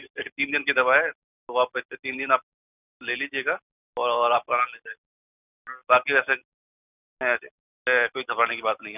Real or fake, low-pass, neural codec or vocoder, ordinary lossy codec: real; 3.6 kHz; none; none